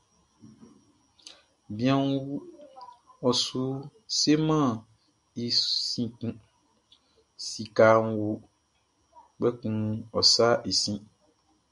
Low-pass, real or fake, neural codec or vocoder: 10.8 kHz; real; none